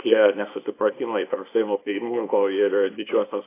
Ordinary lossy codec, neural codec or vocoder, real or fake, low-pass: AAC, 24 kbps; codec, 24 kHz, 0.9 kbps, WavTokenizer, small release; fake; 3.6 kHz